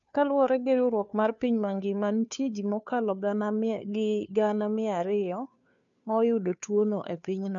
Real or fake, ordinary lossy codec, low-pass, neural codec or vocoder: fake; none; 7.2 kHz; codec, 16 kHz, 2 kbps, FunCodec, trained on Chinese and English, 25 frames a second